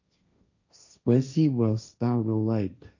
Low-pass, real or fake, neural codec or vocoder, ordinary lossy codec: 7.2 kHz; fake; codec, 16 kHz, 1.1 kbps, Voila-Tokenizer; none